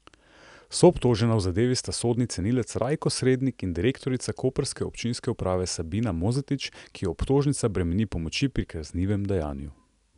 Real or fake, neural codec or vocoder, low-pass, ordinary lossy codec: real; none; 10.8 kHz; none